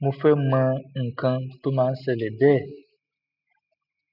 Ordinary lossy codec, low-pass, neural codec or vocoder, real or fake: none; 5.4 kHz; none; real